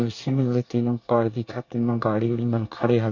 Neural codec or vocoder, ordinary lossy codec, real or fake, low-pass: codec, 24 kHz, 1 kbps, SNAC; MP3, 48 kbps; fake; 7.2 kHz